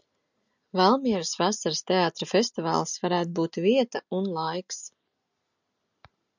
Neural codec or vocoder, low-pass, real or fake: none; 7.2 kHz; real